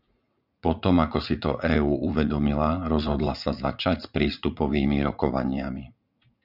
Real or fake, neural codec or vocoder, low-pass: fake; vocoder, 44.1 kHz, 80 mel bands, Vocos; 5.4 kHz